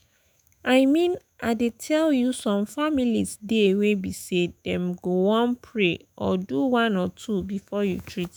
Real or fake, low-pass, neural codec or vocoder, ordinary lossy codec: fake; none; autoencoder, 48 kHz, 128 numbers a frame, DAC-VAE, trained on Japanese speech; none